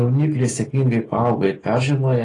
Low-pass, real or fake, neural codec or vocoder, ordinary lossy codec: 10.8 kHz; real; none; AAC, 32 kbps